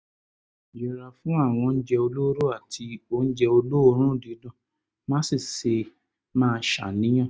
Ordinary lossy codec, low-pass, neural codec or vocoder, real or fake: none; none; none; real